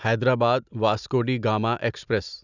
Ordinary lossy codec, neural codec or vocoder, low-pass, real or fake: none; none; 7.2 kHz; real